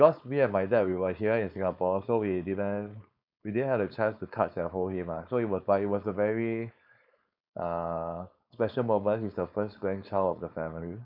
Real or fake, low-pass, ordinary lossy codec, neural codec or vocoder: fake; 5.4 kHz; none; codec, 16 kHz, 4.8 kbps, FACodec